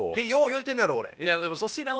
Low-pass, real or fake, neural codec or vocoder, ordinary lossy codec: none; fake; codec, 16 kHz, 0.8 kbps, ZipCodec; none